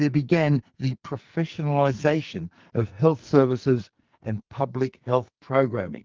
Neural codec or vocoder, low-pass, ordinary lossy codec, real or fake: codec, 44.1 kHz, 2.6 kbps, SNAC; 7.2 kHz; Opus, 32 kbps; fake